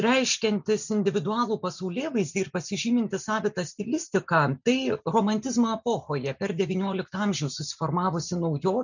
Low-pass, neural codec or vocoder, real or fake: 7.2 kHz; none; real